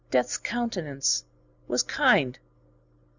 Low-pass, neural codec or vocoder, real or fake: 7.2 kHz; none; real